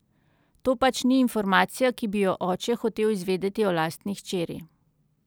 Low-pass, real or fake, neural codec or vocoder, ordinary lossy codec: none; real; none; none